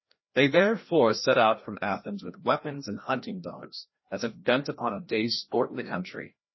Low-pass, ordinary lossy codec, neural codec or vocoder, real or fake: 7.2 kHz; MP3, 24 kbps; codec, 16 kHz, 1 kbps, FreqCodec, larger model; fake